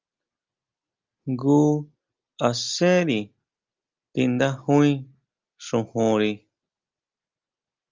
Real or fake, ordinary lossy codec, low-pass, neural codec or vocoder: real; Opus, 24 kbps; 7.2 kHz; none